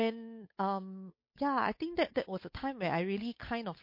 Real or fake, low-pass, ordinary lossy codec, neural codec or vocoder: fake; 5.4 kHz; MP3, 32 kbps; codec, 16 kHz, 8 kbps, FunCodec, trained on Chinese and English, 25 frames a second